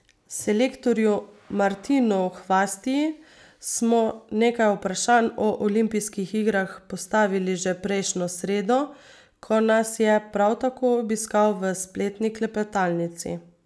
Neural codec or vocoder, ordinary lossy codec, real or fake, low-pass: none; none; real; none